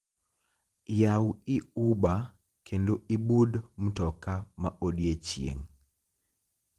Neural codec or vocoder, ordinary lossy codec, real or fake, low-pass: vocoder, 44.1 kHz, 128 mel bands every 512 samples, BigVGAN v2; Opus, 24 kbps; fake; 14.4 kHz